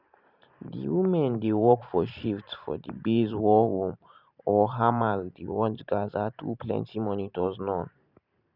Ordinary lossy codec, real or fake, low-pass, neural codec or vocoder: none; fake; 5.4 kHz; vocoder, 44.1 kHz, 128 mel bands every 512 samples, BigVGAN v2